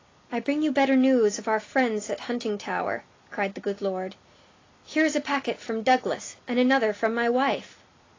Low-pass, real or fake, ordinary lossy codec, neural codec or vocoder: 7.2 kHz; real; AAC, 32 kbps; none